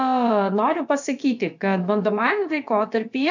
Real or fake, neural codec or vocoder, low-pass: fake; codec, 16 kHz, about 1 kbps, DyCAST, with the encoder's durations; 7.2 kHz